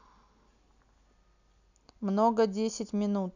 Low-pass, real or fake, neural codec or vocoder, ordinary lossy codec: 7.2 kHz; real; none; none